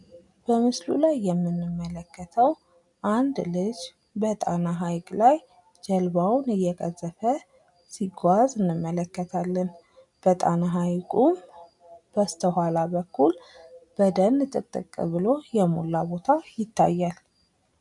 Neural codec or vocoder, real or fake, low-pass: none; real; 10.8 kHz